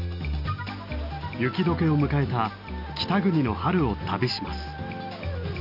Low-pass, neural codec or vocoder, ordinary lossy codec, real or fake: 5.4 kHz; none; none; real